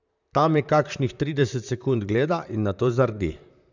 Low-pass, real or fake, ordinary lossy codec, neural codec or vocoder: 7.2 kHz; fake; none; vocoder, 44.1 kHz, 128 mel bands, Pupu-Vocoder